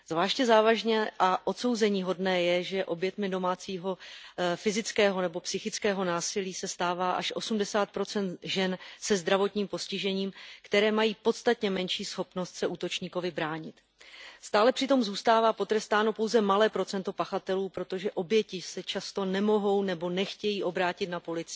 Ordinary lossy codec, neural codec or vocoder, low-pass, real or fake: none; none; none; real